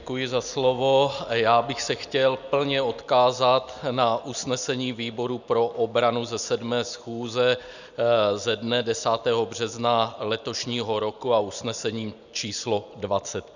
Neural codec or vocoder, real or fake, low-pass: none; real; 7.2 kHz